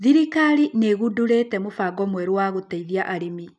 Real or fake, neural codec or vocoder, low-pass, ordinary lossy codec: real; none; 10.8 kHz; none